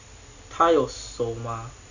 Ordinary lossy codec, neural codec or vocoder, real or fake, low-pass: none; none; real; 7.2 kHz